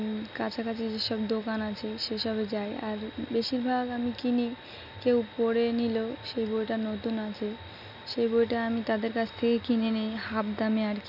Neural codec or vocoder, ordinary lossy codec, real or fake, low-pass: none; none; real; 5.4 kHz